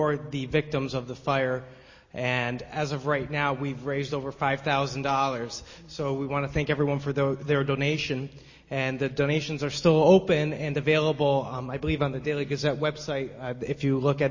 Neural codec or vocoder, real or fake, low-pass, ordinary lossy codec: none; real; 7.2 kHz; MP3, 32 kbps